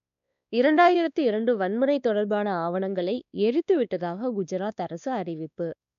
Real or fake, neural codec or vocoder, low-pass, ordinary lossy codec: fake; codec, 16 kHz, 2 kbps, X-Codec, WavLM features, trained on Multilingual LibriSpeech; 7.2 kHz; none